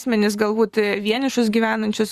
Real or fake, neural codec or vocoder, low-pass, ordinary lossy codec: fake; vocoder, 44.1 kHz, 128 mel bands every 512 samples, BigVGAN v2; 14.4 kHz; Opus, 64 kbps